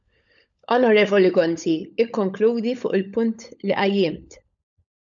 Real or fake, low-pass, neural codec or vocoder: fake; 7.2 kHz; codec, 16 kHz, 16 kbps, FunCodec, trained on LibriTTS, 50 frames a second